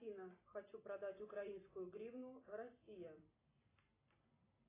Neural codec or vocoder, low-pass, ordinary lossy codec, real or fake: none; 3.6 kHz; AAC, 16 kbps; real